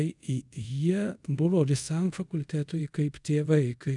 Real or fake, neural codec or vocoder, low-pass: fake; codec, 24 kHz, 0.5 kbps, DualCodec; 10.8 kHz